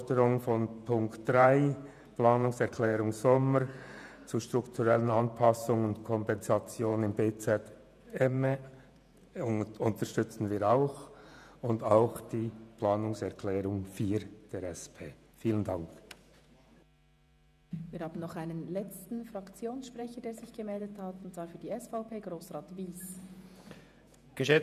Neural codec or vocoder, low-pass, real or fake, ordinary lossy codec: vocoder, 48 kHz, 128 mel bands, Vocos; 14.4 kHz; fake; none